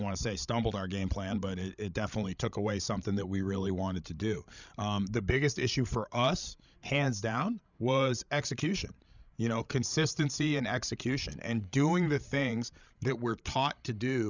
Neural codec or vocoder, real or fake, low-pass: codec, 16 kHz, 16 kbps, FreqCodec, larger model; fake; 7.2 kHz